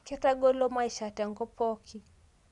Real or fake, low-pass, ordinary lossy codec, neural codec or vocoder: fake; 10.8 kHz; none; vocoder, 24 kHz, 100 mel bands, Vocos